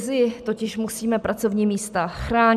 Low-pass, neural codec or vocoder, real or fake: 14.4 kHz; none; real